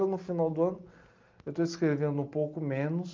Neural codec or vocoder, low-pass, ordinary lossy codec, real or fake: none; 7.2 kHz; Opus, 32 kbps; real